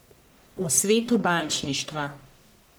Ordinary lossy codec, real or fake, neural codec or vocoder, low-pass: none; fake; codec, 44.1 kHz, 1.7 kbps, Pupu-Codec; none